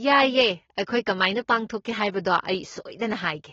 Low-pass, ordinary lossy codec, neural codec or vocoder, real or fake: 7.2 kHz; AAC, 24 kbps; none; real